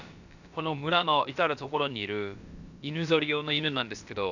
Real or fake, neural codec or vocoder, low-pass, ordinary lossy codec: fake; codec, 16 kHz, about 1 kbps, DyCAST, with the encoder's durations; 7.2 kHz; none